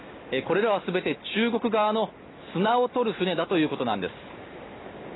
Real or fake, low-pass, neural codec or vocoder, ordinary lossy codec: real; 7.2 kHz; none; AAC, 16 kbps